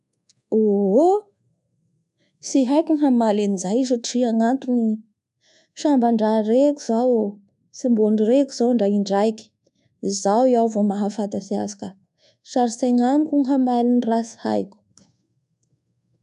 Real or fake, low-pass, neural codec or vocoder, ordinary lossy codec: fake; 10.8 kHz; codec, 24 kHz, 1.2 kbps, DualCodec; none